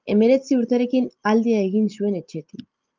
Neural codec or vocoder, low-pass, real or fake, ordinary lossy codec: none; 7.2 kHz; real; Opus, 32 kbps